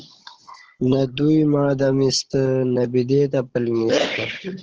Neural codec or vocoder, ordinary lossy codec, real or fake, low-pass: codec, 16 kHz, 16 kbps, FunCodec, trained on Chinese and English, 50 frames a second; Opus, 16 kbps; fake; 7.2 kHz